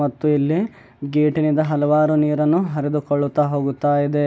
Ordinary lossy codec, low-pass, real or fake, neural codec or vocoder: none; none; real; none